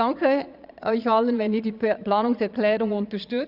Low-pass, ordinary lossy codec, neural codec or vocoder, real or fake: 5.4 kHz; Opus, 64 kbps; vocoder, 22.05 kHz, 80 mel bands, Vocos; fake